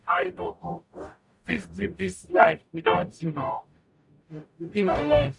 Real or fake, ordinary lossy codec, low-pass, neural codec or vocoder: fake; none; 10.8 kHz; codec, 44.1 kHz, 0.9 kbps, DAC